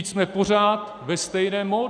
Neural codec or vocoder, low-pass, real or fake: none; 9.9 kHz; real